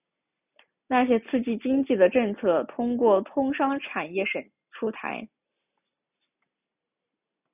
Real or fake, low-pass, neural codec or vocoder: real; 3.6 kHz; none